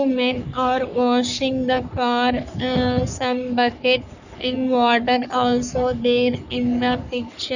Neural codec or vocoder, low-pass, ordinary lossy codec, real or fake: codec, 44.1 kHz, 3.4 kbps, Pupu-Codec; 7.2 kHz; none; fake